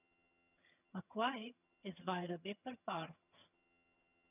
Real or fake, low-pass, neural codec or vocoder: fake; 3.6 kHz; vocoder, 22.05 kHz, 80 mel bands, HiFi-GAN